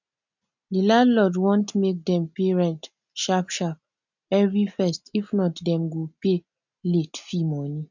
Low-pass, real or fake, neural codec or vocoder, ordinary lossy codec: 7.2 kHz; real; none; none